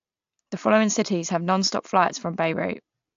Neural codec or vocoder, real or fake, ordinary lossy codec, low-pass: none; real; AAC, 64 kbps; 7.2 kHz